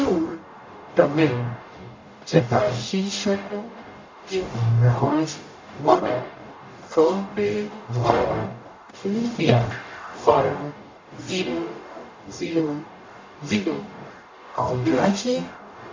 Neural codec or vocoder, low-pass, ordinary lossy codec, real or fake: codec, 44.1 kHz, 0.9 kbps, DAC; 7.2 kHz; MP3, 48 kbps; fake